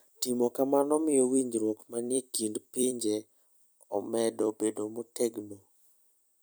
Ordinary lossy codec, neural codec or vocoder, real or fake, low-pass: none; vocoder, 44.1 kHz, 128 mel bands every 256 samples, BigVGAN v2; fake; none